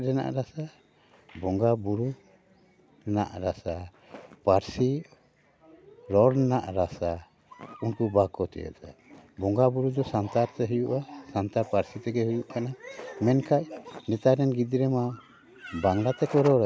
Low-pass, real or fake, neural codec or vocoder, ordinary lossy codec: none; real; none; none